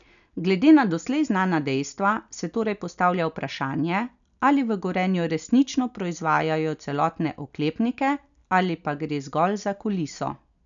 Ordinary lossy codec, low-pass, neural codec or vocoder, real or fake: none; 7.2 kHz; none; real